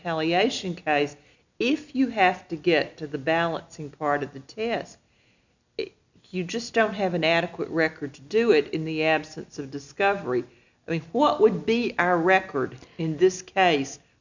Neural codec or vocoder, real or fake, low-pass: none; real; 7.2 kHz